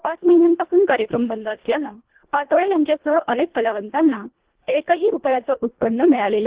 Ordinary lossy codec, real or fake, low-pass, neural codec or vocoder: Opus, 16 kbps; fake; 3.6 kHz; codec, 24 kHz, 1.5 kbps, HILCodec